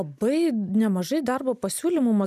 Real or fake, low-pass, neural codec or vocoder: real; 14.4 kHz; none